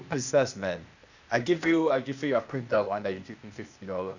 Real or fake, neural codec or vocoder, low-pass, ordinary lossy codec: fake; codec, 16 kHz, 0.8 kbps, ZipCodec; 7.2 kHz; none